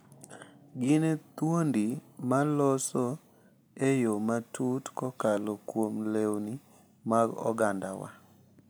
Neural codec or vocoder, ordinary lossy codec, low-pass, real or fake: none; none; none; real